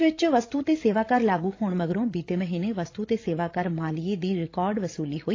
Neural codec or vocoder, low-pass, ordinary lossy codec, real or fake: codec, 16 kHz, 16 kbps, FreqCodec, larger model; 7.2 kHz; AAC, 32 kbps; fake